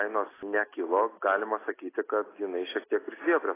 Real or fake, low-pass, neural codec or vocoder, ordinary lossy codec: real; 3.6 kHz; none; AAC, 16 kbps